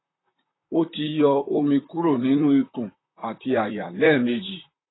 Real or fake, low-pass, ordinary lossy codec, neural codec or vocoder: fake; 7.2 kHz; AAC, 16 kbps; vocoder, 44.1 kHz, 128 mel bands, Pupu-Vocoder